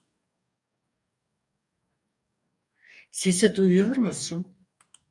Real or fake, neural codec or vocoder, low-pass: fake; codec, 44.1 kHz, 2.6 kbps, DAC; 10.8 kHz